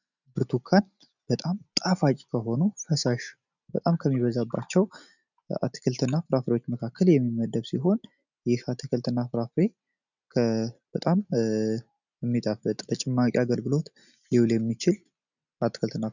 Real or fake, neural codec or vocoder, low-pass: real; none; 7.2 kHz